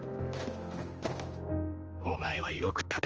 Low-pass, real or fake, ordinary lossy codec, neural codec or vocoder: 7.2 kHz; fake; Opus, 24 kbps; codec, 16 kHz, 2 kbps, X-Codec, HuBERT features, trained on general audio